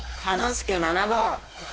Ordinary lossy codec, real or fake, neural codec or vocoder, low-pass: none; fake; codec, 16 kHz, 2 kbps, X-Codec, WavLM features, trained on Multilingual LibriSpeech; none